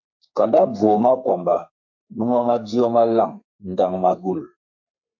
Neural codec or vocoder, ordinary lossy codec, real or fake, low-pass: codec, 32 kHz, 1.9 kbps, SNAC; MP3, 48 kbps; fake; 7.2 kHz